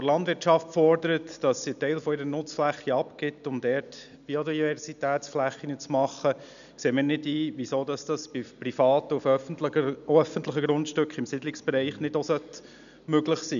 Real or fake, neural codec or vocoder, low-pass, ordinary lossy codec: real; none; 7.2 kHz; none